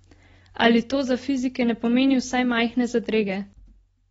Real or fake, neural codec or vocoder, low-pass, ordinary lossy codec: real; none; 10.8 kHz; AAC, 24 kbps